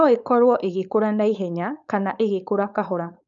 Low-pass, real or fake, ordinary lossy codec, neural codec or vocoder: 7.2 kHz; fake; none; codec, 16 kHz, 4.8 kbps, FACodec